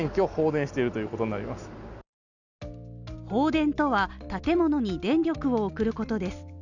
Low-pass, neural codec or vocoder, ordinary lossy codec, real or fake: 7.2 kHz; none; none; real